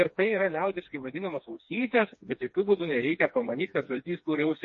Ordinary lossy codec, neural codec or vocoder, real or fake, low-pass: MP3, 32 kbps; codec, 16 kHz, 2 kbps, FreqCodec, smaller model; fake; 7.2 kHz